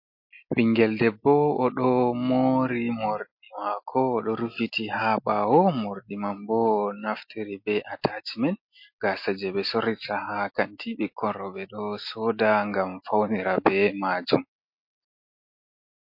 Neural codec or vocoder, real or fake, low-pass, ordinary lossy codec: none; real; 5.4 kHz; MP3, 32 kbps